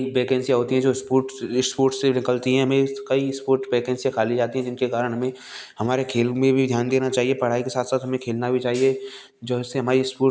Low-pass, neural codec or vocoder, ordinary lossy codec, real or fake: none; none; none; real